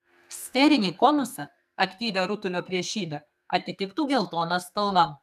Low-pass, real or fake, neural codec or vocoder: 14.4 kHz; fake; codec, 44.1 kHz, 2.6 kbps, SNAC